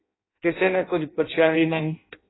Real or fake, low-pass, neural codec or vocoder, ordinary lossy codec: fake; 7.2 kHz; codec, 16 kHz in and 24 kHz out, 0.6 kbps, FireRedTTS-2 codec; AAC, 16 kbps